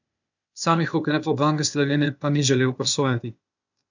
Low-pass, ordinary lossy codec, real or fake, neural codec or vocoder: 7.2 kHz; none; fake; codec, 16 kHz, 0.8 kbps, ZipCodec